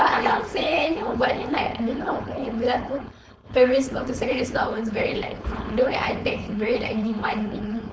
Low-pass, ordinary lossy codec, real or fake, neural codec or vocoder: none; none; fake; codec, 16 kHz, 4.8 kbps, FACodec